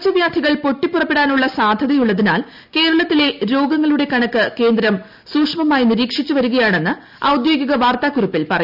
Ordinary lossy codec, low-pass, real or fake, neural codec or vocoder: none; 5.4 kHz; real; none